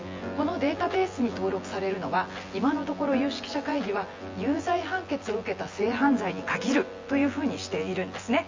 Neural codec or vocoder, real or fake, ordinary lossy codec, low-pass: vocoder, 24 kHz, 100 mel bands, Vocos; fake; Opus, 32 kbps; 7.2 kHz